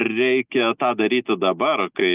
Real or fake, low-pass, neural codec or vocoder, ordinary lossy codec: real; 3.6 kHz; none; Opus, 32 kbps